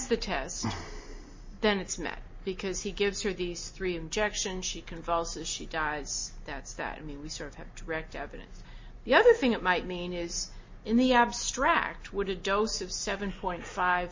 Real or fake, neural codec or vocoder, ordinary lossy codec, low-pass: real; none; MP3, 32 kbps; 7.2 kHz